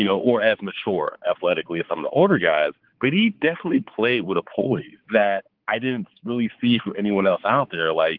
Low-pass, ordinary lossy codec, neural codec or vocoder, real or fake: 5.4 kHz; Opus, 16 kbps; codec, 16 kHz, 4 kbps, X-Codec, HuBERT features, trained on balanced general audio; fake